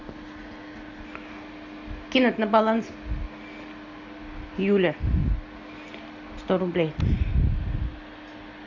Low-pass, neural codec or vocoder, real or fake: 7.2 kHz; none; real